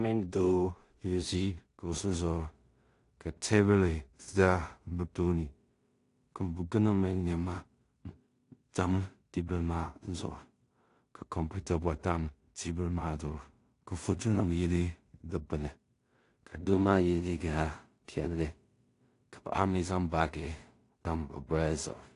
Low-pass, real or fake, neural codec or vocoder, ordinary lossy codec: 10.8 kHz; fake; codec, 16 kHz in and 24 kHz out, 0.4 kbps, LongCat-Audio-Codec, two codebook decoder; AAC, 48 kbps